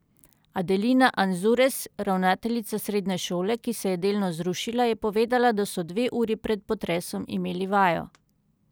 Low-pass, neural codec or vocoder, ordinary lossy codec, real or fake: none; none; none; real